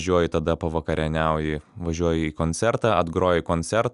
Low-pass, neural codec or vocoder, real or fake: 10.8 kHz; none; real